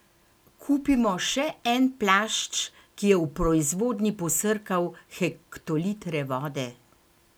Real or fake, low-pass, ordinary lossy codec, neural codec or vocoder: real; none; none; none